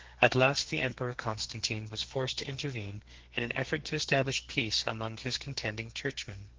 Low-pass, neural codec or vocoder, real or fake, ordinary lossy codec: 7.2 kHz; codec, 32 kHz, 1.9 kbps, SNAC; fake; Opus, 16 kbps